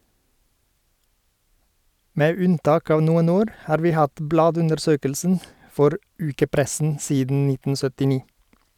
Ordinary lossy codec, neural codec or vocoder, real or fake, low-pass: none; none; real; 19.8 kHz